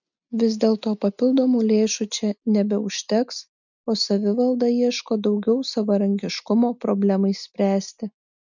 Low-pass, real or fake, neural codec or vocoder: 7.2 kHz; real; none